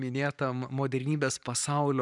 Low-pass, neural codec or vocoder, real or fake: 10.8 kHz; vocoder, 44.1 kHz, 128 mel bands every 512 samples, BigVGAN v2; fake